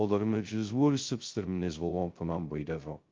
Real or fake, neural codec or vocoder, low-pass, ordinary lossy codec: fake; codec, 16 kHz, 0.2 kbps, FocalCodec; 7.2 kHz; Opus, 32 kbps